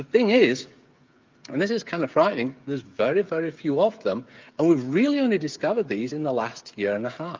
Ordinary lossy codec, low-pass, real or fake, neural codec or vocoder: Opus, 24 kbps; 7.2 kHz; fake; codec, 16 kHz, 8 kbps, FreqCodec, smaller model